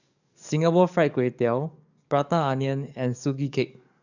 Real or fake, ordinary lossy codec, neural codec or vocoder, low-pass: fake; none; codec, 44.1 kHz, 7.8 kbps, DAC; 7.2 kHz